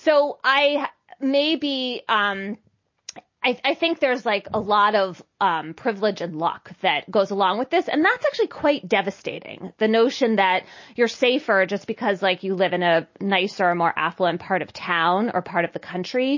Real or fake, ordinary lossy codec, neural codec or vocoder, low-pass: real; MP3, 32 kbps; none; 7.2 kHz